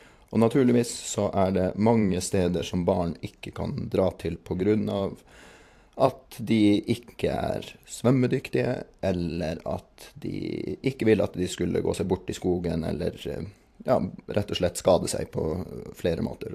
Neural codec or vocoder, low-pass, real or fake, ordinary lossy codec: vocoder, 44.1 kHz, 128 mel bands every 512 samples, BigVGAN v2; 14.4 kHz; fake; AAC, 64 kbps